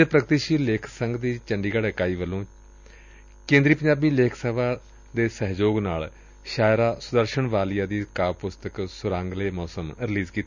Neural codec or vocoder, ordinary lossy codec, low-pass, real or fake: none; none; 7.2 kHz; real